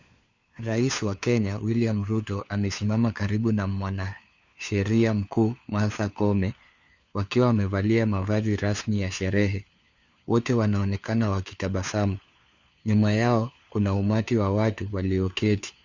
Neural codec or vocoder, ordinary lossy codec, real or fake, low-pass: codec, 16 kHz, 4 kbps, FunCodec, trained on LibriTTS, 50 frames a second; Opus, 64 kbps; fake; 7.2 kHz